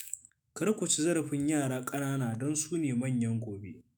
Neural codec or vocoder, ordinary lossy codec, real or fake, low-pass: autoencoder, 48 kHz, 128 numbers a frame, DAC-VAE, trained on Japanese speech; none; fake; none